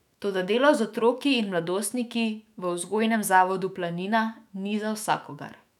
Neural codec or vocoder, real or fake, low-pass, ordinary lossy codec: autoencoder, 48 kHz, 128 numbers a frame, DAC-VAE, trained on Japanese speech; fake; 19.8 kHz; none